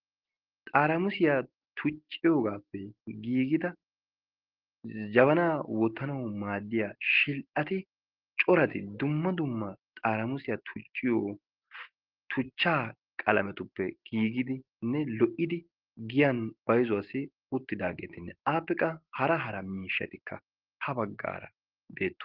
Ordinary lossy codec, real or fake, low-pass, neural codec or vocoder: Opus, 16 kbps; real; 5.4 kHz; none